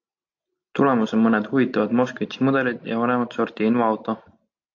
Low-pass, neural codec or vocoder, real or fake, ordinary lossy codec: 7.2 kHz; none; real; AAC, 48 kbps